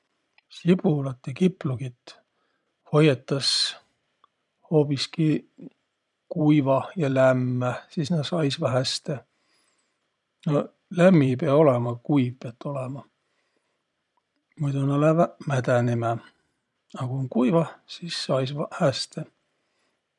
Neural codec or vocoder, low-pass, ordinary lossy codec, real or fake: vocoder, 44.1 kHz, 128 mel bands every 512 samples, BigVGAN v2; 10.8 kHz; none; fake